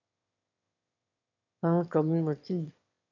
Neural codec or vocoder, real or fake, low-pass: autoencoder, 22.05 kHz, a latent of 192 numbers a frame, VITS, trained on one speaker; fake; 7.2 kHz